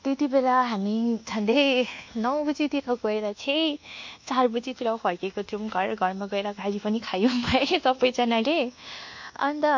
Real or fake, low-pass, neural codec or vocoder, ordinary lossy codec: fake; 7.2 kHz; codec, 24 kHz, 1.2 kbps, DualCodec; MP3, 48 kbps